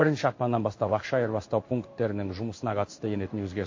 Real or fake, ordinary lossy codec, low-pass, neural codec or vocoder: fake; MP3, 32 kbps; 7.2 kHz; codec, 16 kHz in and 24 kHz out, 1 kbps, XY-Tokenizer